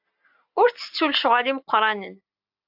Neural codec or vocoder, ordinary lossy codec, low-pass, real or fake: none; AAC, 48 kbps; 5.4 kHz; real